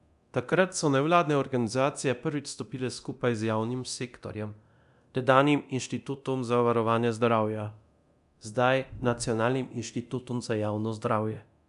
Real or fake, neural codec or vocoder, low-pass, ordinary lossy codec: fake; codec, 24 kHz, 0.9 kbps, DualCodec; 10.8 kHz; none